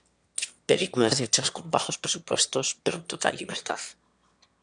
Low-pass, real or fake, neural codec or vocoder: 9.9 kHz; fake; autoencoder, 22.05 kHz, a latent of 192 numbers a frame, VITS, trained on one speaker